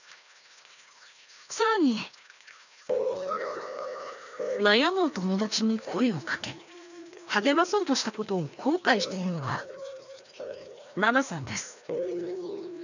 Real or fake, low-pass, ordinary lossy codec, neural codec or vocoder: fake; 7.2 kHz; none; codec, 16 kHz, 1 kbps, FreqCodec, larger model